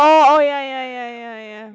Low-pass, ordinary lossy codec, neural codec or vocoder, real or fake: none; none; none; real